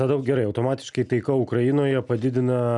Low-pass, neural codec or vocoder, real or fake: 10.8 kHz; none; real